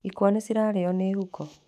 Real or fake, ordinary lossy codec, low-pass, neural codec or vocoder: fake; none; 14.4 kHz; autoencoder, 48 kHz, 128 numbers a frame, DAC-VAE, trained on Japanese speech